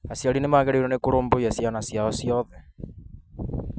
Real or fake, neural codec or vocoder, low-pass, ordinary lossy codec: real; none; none; none